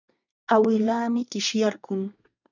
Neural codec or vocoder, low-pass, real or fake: codec, 24 kHz, 1 kbps, SNAC; 7.2 kHz; fake